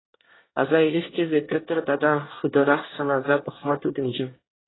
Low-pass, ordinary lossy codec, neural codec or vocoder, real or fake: 7.2 kHz; AAC, 16 kbps; codec, 24 kHz, 1 kbps, SNAC; fake